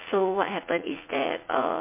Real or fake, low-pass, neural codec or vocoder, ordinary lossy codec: fake; 3.6 kHz; vocoder, 44.1 kHz, 80 mel bands, Vocos; MP3, 24 kbps